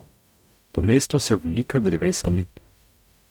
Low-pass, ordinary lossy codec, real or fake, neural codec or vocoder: 19.8 kHz; none; fake; codec, 44.1 kHz, 0.9 kbps, DAC